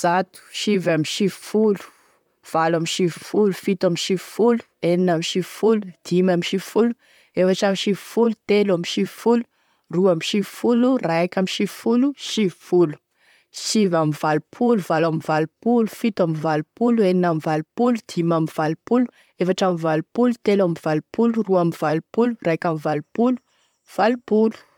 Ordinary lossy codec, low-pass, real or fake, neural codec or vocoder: MP3, 96 kbps; 19.8 kHz; fake; vocoder, 44.1 kHz, 128 mel bands, Pupu-Vocoder